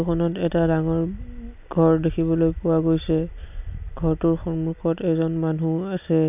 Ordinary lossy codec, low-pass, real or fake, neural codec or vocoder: none; 3.6 kHz; real; none